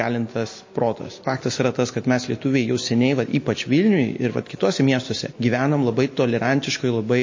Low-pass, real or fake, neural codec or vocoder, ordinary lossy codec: 7.2 kHz; real; none; MP3, 32 kbps